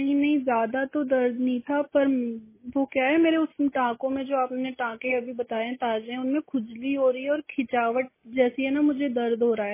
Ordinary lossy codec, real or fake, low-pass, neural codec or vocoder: MP3, 16 kbps; real; 3.6 kHz; none